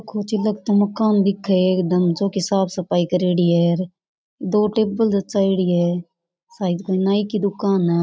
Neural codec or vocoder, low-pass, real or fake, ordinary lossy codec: none; none; real; none